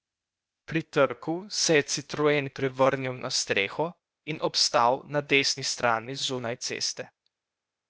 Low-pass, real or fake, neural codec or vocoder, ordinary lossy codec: none; fake; codec, 16 kHz, 0.8 kbps, ZipCodec; none